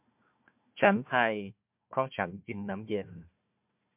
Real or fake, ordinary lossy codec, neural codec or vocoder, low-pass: fake; MP3, 32 kbps; codec, 16 kHz, 1 kbps, FunCodec, trained on Chinese and English, 50 frames a second; 3.6 kHz